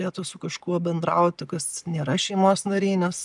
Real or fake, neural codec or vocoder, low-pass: real; none; 10.8 kHz